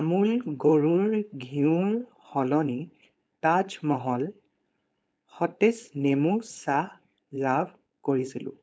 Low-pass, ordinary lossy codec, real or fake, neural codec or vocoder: none; none; fake; codec, 16 kHz, 4.8 kbps, FACodec